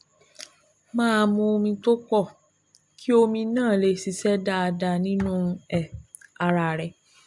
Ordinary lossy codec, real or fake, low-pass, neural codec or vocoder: MP3, 64 kbps; real; 10.8 kHz; none